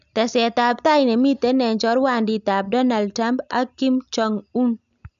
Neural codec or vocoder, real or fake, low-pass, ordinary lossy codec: none; real; 7.2 kHz; none